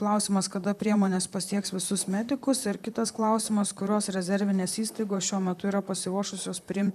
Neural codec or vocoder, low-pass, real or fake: vocoder, 44.1 kHz, 128 mel bands, Pupu-Vocoder; 14.4 kHz; fake